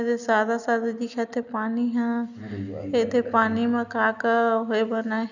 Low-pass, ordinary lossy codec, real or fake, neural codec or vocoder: 7.2 kHz; none; real; none